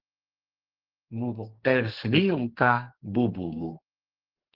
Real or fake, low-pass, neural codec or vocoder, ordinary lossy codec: fake; 5.4 kHz; codec, 44.1 kHz, 2.6 kbps, SNAC; Opus, 16 kbps